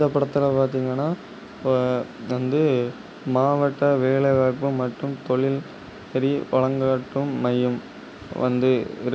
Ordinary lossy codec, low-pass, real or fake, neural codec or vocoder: none; none; real; none